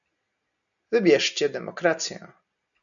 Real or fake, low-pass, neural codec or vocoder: real; 7.2 kHz; none